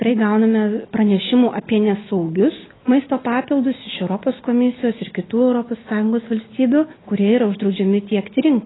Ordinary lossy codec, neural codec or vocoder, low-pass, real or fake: AAC, 16 kbps; none; 7.2 kHz; real